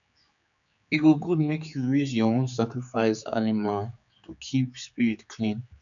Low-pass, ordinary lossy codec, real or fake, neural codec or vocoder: 7.2 kHz; none; fake; codec, 16 kHz, 4 kbps, X-Codec, HuBERT features, trained on general audio